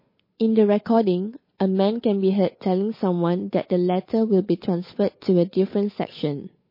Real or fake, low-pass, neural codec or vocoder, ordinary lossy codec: fake; 5.4 kHz; codec, 16 kHz, 8 kbps, FunCodec, trained on Chinese and English, 25 frames a second; MP3, 24 kbps